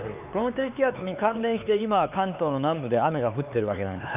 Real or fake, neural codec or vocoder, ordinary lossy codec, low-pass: fake; codec, 16 kHz, 4 kbps, X-Codec, HuBERT features, trained on LibriSpeech; none; 3.6 kHz